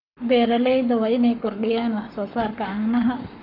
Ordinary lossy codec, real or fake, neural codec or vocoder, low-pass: none; fake; codec, 44.1 kHz, 7.8 kbps, Pupu-Codec; 5.4 kHz